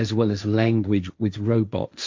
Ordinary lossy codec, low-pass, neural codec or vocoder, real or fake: MP3, 64 kbps; 7.2 kHz; codec, 16 kHz, 1.1 kbps, Voila-Tokenizer; fake